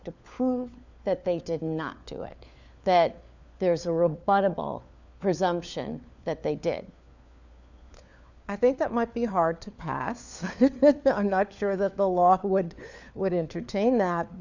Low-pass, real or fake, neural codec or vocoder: 7.2 kHz; fake; codec, 16 kHz, 4 kbps, FunCodec, trained on LibriTTS, 50 frames a second